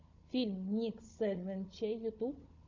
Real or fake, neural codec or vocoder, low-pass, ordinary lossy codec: fake; codec, 16 kHz, 16 kbps, FunCodec, trained on Chinese and English, 50 frames a second; 7.2 kHz; MP3, 64 kbps